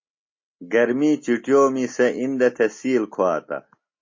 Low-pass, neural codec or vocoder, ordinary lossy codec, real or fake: 7.2 kHz; none; MP3, 32 kbps; real